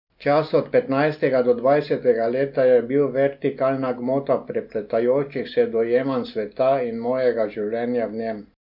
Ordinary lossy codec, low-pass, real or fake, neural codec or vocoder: none; 5.4 kHz; real; none